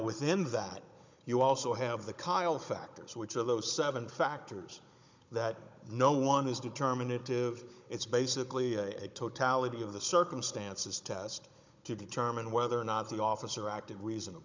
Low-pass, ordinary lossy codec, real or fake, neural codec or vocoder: 7.2 kHz; MP3, 64 kbps; fake; codec, 16 kHz, 16 kbps, FunCodec, trained on Chinese and English, 50 frames a second